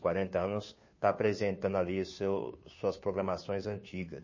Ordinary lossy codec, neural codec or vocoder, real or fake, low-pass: MP3, 32 kbps; codec, 16 kHz, 2 kbps, FunCodec, trained on Chinese and English, 25 frames a second; fake; 7.2 kHz